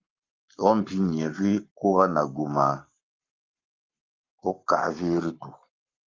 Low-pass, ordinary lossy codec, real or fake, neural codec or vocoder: 7.2 kHz; Opus, 24 kbps; fake; codec, 44.1 kHz, 7.8 kbps, Pupu-Codec